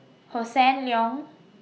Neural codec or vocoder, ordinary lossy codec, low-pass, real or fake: none; none; none; real